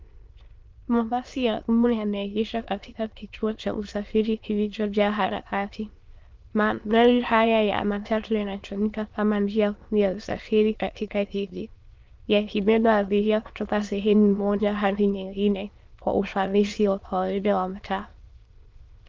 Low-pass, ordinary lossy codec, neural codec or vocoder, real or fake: 7.2 kHz; Opus, 24 kbps; autoencoder, 22.05 kHz, a latent of 192 numbers a frame, VITS, trained on many speakers; fake